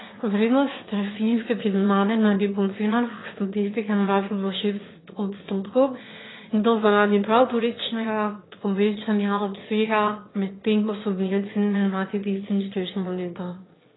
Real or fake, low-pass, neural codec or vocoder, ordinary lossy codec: fake; 7.2 kHz; autoencoder, 22.05 kHz, a latent of 192 numbers a frame, VITS, trained on one speaker; AAC, 16 kbps